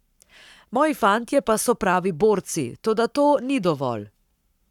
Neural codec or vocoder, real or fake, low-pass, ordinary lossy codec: codec, 44.1 kHz, 7.8 kbps, Pupu-Codec; fake; 19.8 kHz; none